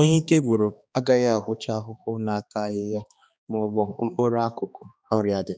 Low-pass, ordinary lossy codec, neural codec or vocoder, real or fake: none; none; codec, 16 kHz, 2 kbps, X-Codec, HuBERT features, trained on balanced general audio; fake